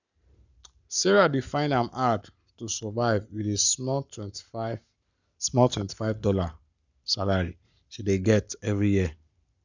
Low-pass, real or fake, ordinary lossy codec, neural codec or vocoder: 7.2 kHz; fake; none; codec, 44.1 kHz, 7.8 kbps, Pupu-Codec